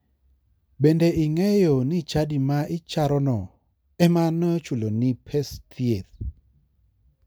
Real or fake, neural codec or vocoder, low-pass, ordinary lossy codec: real; none; none; none